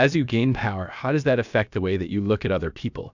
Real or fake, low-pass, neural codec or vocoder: fake; 7.2 kHz; codec, 16 kHz, about 1 kbps, DyCAST, with the encoder's durations